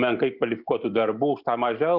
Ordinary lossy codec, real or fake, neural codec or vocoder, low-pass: Opus, 64 kbps; real; none; 5.4 kHz